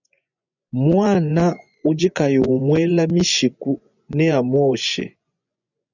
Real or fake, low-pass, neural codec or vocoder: fake; 7.2 kHz; vocoder, 24 kHz, 100 mel bands, Vocos